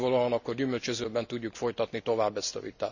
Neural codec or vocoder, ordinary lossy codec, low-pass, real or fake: none; none; 7.2 kHz; real